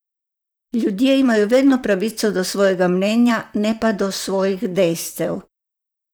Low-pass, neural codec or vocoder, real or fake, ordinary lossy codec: none; vocoder, 44.1 kHz, 128 mel bands, Pupu-Vocoder; fake; none